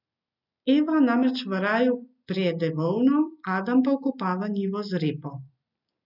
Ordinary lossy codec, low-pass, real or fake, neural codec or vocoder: none; 5.4 kHz; real; none